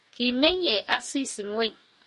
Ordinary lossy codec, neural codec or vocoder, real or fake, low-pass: MP3, 48 kbps; codec, 44.1 kHz, 2.6 kbps, DAC; fake; 14.4 kHz